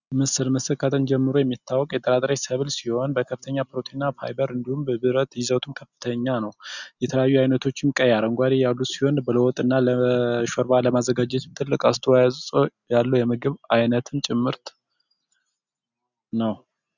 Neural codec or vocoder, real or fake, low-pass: none; real; 7.2 kHz